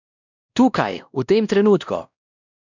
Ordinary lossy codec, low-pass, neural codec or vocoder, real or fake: none; 7.2 kHz; codec, 16 kHz, 1 kbps, X-Codec, WavLM features, trained on Multilingual LibriSpeech; fake